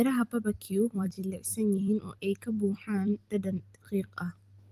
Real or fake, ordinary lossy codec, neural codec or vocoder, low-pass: fake; Opus, 24 kbps; vocoder, 44.1 kHz, 128 mel bands every 256 samples, BigVGAN v2; 14.4 kHz